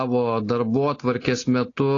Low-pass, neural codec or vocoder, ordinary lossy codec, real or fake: 7.2 kHz; none; AAC, 32 kbps; real